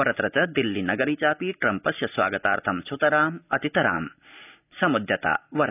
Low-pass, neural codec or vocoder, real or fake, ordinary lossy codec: 3.6 kHz; none; real; none